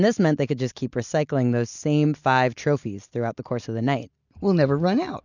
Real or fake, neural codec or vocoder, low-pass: real; none; 7.2 kHz